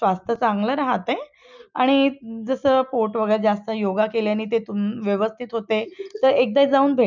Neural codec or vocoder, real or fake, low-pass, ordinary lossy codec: none; real; 7.2 kHz; none